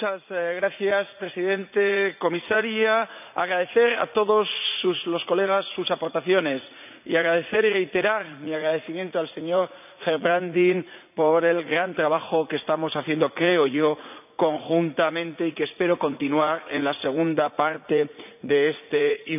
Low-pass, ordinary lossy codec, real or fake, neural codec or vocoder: 3.6 kHz; none; fake; vocoder, 44.1 kHz, 80 mel bands, Vocos